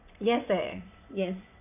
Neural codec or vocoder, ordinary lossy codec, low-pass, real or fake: vocoder, 22.05 kHz, 80 mel bands, WaveNeXt; none; 3.6 kHz; fake